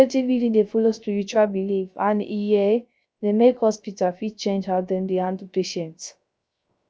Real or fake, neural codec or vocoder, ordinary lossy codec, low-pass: fake; codec, 16 kHz, 0.3 kbps, FocalCodec; none; none